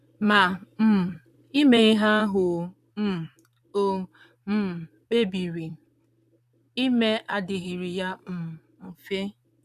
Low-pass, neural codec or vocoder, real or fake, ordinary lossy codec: 14.4 kHz; vocoder, 44.1 kHz, 128 mel bands, Pupu-Vocoder; fake; none